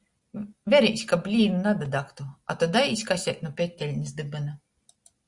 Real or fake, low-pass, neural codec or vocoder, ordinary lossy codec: real; 10.8 kHz; none; Opus, 64 kbps